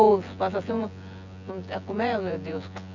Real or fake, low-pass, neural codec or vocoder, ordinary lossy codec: fake; 7.2 kHz; vocoder, 24 kHz, 100 mel bands, Vocos; none